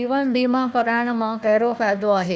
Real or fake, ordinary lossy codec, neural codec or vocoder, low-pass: fake; none; codec, 16 kHz, 1 kbps, FunCodec, trained on Chinese and English, 50 frames a second; none